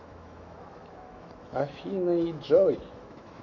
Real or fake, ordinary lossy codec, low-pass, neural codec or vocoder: fake; AAC, 48 kbps; 7.2 kHz; autoencoder, 48 kHz, 128 numbers a frame, DAC-VAE, trained on Japanese speech